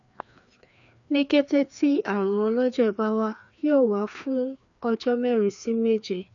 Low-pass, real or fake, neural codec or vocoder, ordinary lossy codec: 7.2 kHz; fake; codec, 16 kHz, 2 kbps, FreqCodec, larger model; none